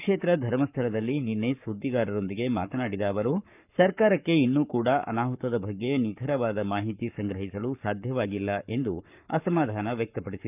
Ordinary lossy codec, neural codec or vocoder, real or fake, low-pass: none; codec, 44.1 kHz, 7.8 kbps, Pupu-Codec; fake; 3.6 kHz